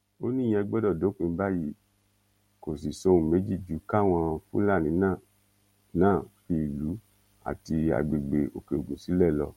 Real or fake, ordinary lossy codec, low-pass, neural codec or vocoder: real; MP3, 64 kbps; 19.8 kHz; none